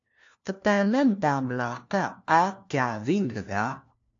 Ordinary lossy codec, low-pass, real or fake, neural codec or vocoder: AAC, 48 kbps; 7.2 kHz; fake; codec, 16 kHz, 1 kbps, FunCodec, trained on LibriTTS, 50 frames a second